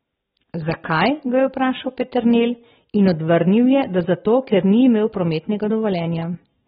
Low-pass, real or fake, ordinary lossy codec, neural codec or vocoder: 9.9 kHz; real; AAC, 16 kbps; none